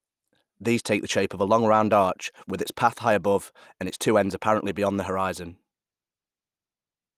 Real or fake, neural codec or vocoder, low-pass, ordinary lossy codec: real; none; 14.4 kHz; Opus, 32 kbps